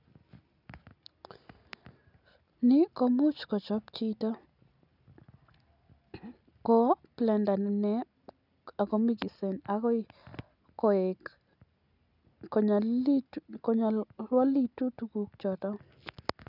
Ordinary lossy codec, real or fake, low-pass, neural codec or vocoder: none; real; 5.4 kHz; none